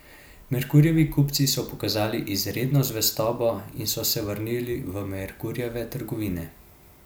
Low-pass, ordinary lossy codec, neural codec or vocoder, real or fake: none; none; none; real